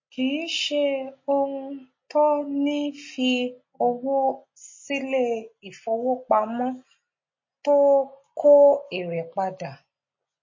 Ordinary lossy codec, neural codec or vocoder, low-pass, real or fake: MP3, 32 kbps; none; 7.2 kHz; real